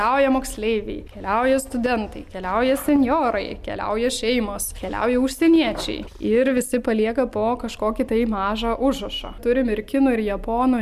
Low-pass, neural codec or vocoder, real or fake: 14.4 kHz; none; real